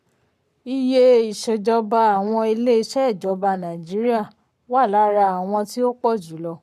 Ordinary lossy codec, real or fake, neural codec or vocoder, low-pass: none; fake; codec, 44.1 kHz, 7.8 kbps, Pupu-Codec; 14.4 kHz